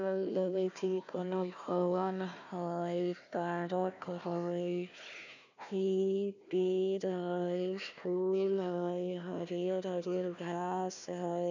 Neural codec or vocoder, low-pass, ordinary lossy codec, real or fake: codec, 16 kHz, 1 kbps, FunCodec, trained on Chinese and English, 50 frames a second; 7.2 kHz; none; fake